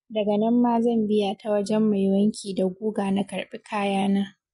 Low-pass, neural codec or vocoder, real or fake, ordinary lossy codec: 10.8 kHz; none; real; MP3, 48 kbps